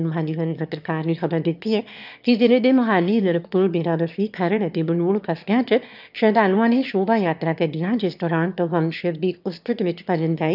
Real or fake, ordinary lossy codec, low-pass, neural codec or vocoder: fake; none; 5.4 kHz; autoencoder, 22.05 kHz, a latent of 192 numbers a frame, VITS, trained on one speaker